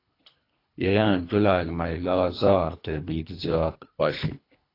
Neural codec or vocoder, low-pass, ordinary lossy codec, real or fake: codec, 24 kHz, 3 kbps, HILCodec; 5.4 kHz; AAC, 32 kbps; fake